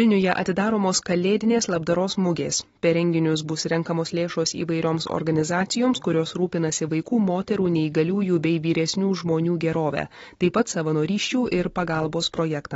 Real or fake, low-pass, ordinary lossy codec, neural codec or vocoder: real; 19.8 kHz; AAC, 24 kbps; none